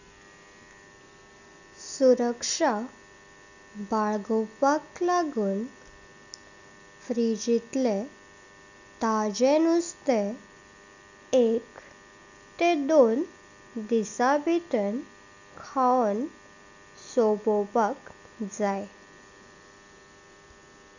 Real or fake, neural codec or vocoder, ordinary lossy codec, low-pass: real; none; none; 7.2 kHz